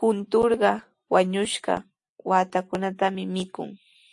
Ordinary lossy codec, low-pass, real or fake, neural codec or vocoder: AAC, 64 kbps; 10.8 kHz; real; none